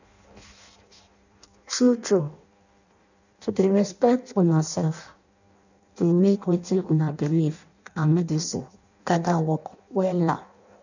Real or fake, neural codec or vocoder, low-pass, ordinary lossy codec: fake; codec, 16 kHz in and 24 kHz out, 0.6 kbps, FireRedTTS-2 codec; 7.2 kHz; none